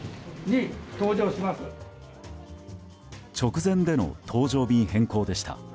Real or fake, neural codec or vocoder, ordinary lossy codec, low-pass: real; none; none; none